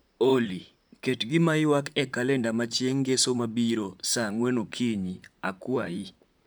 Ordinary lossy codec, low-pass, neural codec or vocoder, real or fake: none; none; vocoder, 44.1 kHz, 128 mel bands, Pupu-Vocoder; fake